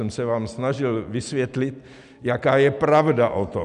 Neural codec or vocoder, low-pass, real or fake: none; 10.8 kHz; real